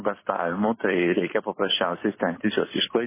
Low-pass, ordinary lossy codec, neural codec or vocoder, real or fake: 3.6 kHz; MP3, 16 kbps; vocoder, 44.1 kHz, 128 mel bands every 256 samples, BigVGAN v2; fake